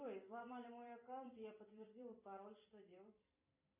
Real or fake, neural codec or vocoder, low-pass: fake; vocoder, 44.1 kHz, 128 mel bands every 256 samples, BigVGAN v2; 3.6 kHz